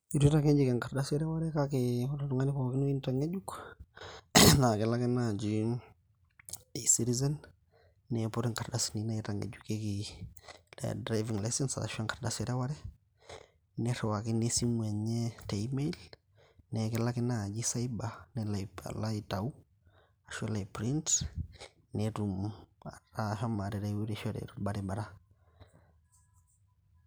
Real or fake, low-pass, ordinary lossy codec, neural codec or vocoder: real; none; none; none